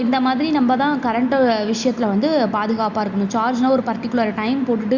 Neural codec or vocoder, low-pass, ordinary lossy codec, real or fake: none; 7.2 kHz; Opus, 64 kbps; real